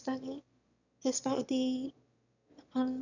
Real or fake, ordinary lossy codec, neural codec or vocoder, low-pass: fake; none; autoencoder, 22.05 kHz, a latent of 192 numbers a frame, VITS, trained on one speaker; 7.2 kHz